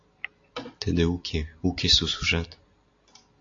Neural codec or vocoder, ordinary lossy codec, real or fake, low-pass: none; AAC, 48 kbps; real; 7.2 kHz